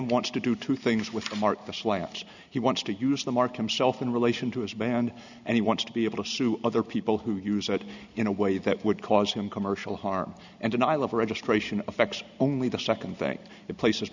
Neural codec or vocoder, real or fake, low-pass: none; real; 7.2 kHz